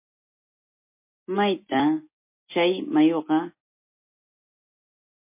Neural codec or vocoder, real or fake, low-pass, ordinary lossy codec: none; real; 3.6 kHz; MP3, 24 kbps